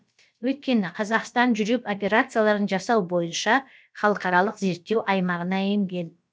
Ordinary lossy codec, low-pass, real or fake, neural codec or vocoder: none; none; fake; codec, 16 kHz, about 1 kbps, DyCAST, with the encoder's durations